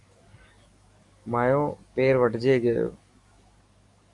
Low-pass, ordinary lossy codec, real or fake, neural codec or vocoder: 10.8 kHz; Opus, 64 kbps; fake; codec, 44.1 kHz, 7.8 kbps, DAC